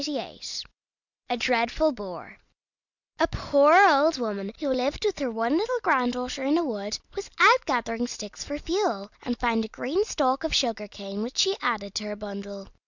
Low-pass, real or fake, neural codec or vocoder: 7.2 kHz; real; none